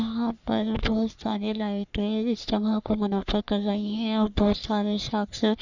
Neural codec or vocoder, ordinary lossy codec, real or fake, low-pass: codec, 44.1 kHz, 3.4 kbps, Pupu-Codec; none; fake; 7.2 kHz